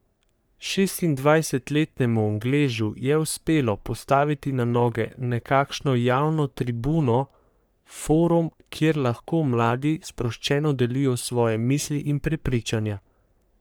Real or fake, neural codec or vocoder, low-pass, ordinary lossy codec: fake; codec, 44.1 kHz, 3.4 kbps, Pupu-Codec; none; none